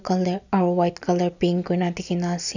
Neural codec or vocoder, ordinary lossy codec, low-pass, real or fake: none; none; 7.2 kHz; real